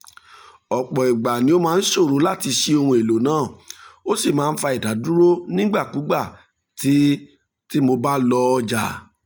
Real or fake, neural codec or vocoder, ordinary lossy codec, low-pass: real; none; none; none